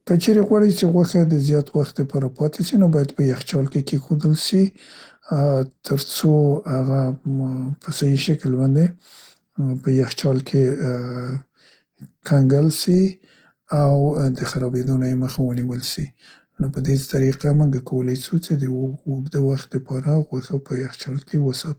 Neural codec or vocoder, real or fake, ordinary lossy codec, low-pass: none; real; Opus, 16 kbps; 19.8 kHz